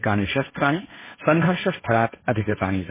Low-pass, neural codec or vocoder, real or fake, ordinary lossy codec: 3.6 kHz; codec, 16 kHz, 1.1 kbps, Voila-Tokenizer; fake; MP3, 16 kbps